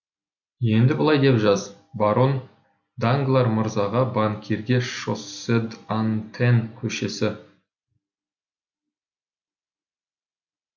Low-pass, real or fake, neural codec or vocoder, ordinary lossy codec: 7.2 kHz; real; none; none